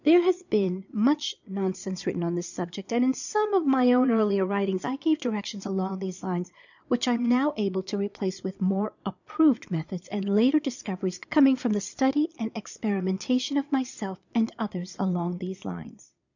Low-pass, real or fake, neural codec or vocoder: 7.2 kHz; fake; vocoder, 22.05 kHz, 80 mel bands, Vocos